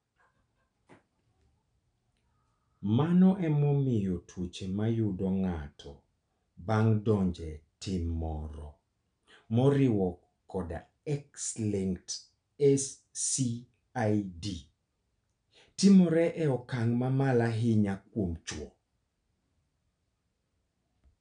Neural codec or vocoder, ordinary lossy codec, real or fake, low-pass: none; none; real; 9.9 kHz